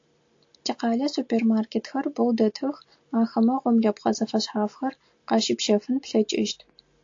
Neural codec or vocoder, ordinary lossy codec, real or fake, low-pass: none; AAC, 48 kbps; real; 7.2 kHz